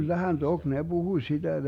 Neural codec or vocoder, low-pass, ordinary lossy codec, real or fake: none; 19.8 kHz; none; real